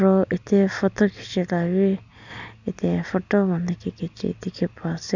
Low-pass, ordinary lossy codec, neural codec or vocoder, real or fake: 7.2 kHz; none; none; real